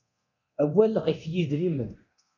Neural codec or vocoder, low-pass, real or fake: codec, 24 kHz, 0.9 kbps, DualCodec; 7.2 kHz; fake